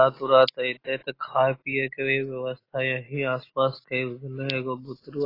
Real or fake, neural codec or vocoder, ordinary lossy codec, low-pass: real; none; AAC, 24 kbps; 5.4 kHz